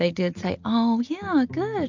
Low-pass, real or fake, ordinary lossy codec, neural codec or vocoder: 7.2 kHz; real; MP3, 64 kbps; none